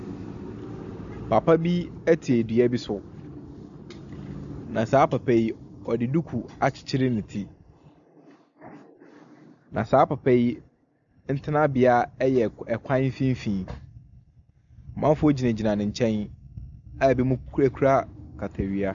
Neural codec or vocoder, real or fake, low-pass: none; real; 7.2 kHz